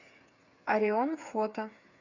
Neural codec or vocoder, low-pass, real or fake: codec, 16 kHz, 8 kbps, FreqCodec, smaller model; 7.2 kHz; fake